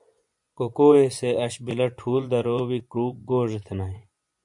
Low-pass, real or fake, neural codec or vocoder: 10.8 kHz; fake; vocoder, 24 kHz, 100 mel bands, Vocos